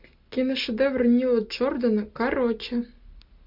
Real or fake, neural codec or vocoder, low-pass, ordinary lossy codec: real; none; 5.4 kHz; MP3, 48 kbps